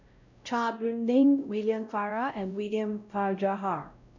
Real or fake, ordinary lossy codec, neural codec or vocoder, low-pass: fake; none; codec, 16 kHz, 0.5 kbps, X-Codec, WavLM features, trained on Multilingual LibriSpeech; 7.2 kHz